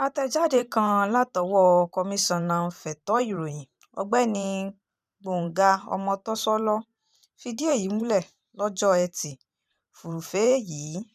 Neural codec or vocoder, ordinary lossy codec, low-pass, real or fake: vocoder, 44.1 kHz, 128 mel bands every 512 samples, BigVGAN v2; none; 14.4 kHz; fake